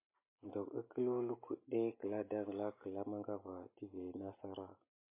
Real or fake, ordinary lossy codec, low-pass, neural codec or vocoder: real; MP3, 24 kbps; 3.6 kHz; none